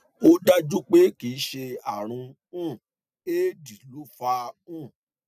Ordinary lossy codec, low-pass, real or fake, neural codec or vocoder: none; 14.4 kHz; real; none